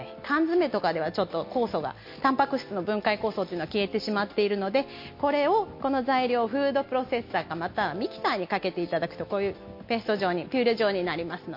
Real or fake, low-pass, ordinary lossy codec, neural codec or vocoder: real; 5.4 kHz; MP3, 32 kbps; none